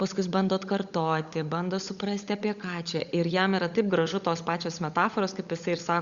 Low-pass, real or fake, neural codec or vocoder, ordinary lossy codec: 7.2 kHz; fake; codec, 16 kHz, 16 kbps, FunCodec, trained on LibriTTS, 50 frames a second; Opus, 64 kbps